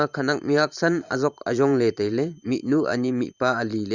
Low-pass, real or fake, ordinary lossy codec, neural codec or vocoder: 7.2 kHz; fake; Opus, 64 kbps; vocoder, 44.1 kHz, 128 mel bands every 512 samples, BigVGAN v2